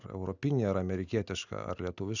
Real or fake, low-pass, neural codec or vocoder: real; 7.2 kHz; none